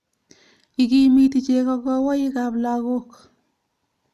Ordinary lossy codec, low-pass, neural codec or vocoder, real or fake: none; 14.4 kHz; none; real